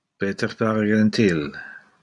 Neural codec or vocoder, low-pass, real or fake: none; 10.8 kHz; real